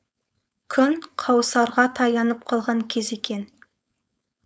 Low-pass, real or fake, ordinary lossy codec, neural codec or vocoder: none; fake; none; codec, 16 kHz, 4.8 kbps, FACodec